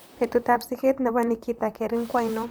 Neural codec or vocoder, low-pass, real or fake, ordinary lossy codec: vocoder, 44.1 kHz, 128 mel bands, Pupu-Vocoder; none; fake; none